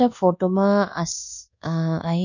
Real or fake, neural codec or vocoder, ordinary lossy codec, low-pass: fake; codec, 24 kHz, 1.2 kbps, DualCodec; none; 7.2 kHz